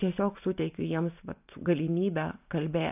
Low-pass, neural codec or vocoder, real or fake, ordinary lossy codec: 3.6 kHz; none; real; AAC, 32 kbps